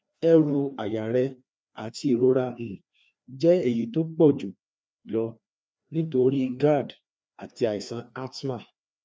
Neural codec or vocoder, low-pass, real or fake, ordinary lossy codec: codec, 16 kHz, 2 kbps, FreqCodec, larger model; none; fake; none